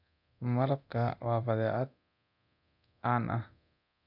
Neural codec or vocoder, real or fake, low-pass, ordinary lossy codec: codec, 24 kHz, 0.9 kbps, DualCodec; fake; 5.4 kHz; none